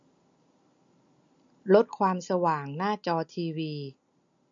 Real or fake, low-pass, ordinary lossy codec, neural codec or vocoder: real; 7.2 kHz; MP3, 48 kbps; none